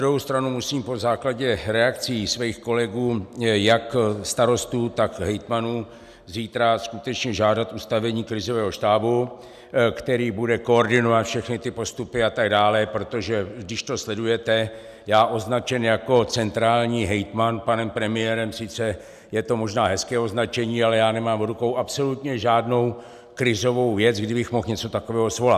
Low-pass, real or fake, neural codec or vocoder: 14.4 kHz; real; none